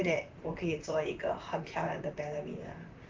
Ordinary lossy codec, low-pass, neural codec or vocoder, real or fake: Opus, 32 kbps; 7.2 kHz; vocoder, 44.1 kHz, 128 mel bands, Pupu-Vocoder; fake